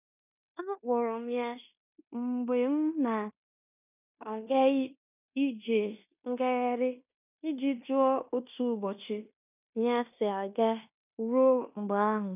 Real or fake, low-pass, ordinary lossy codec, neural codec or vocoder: fake; 3.6 kHz; none; codec, 16 kHz in and 24 kHz out, 0.9 kbps, LongCat-Audio-Codec, fine tuned four codebook decoder